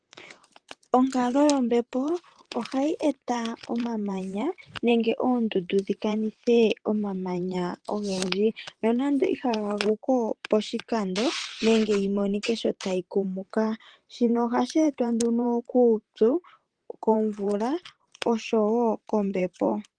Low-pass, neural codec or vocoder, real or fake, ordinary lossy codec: 9.9 kHz; vocoder, 44.1 kHz, 128 mel bands, Pupu-Vocoder; fake; Opus, 24 kbps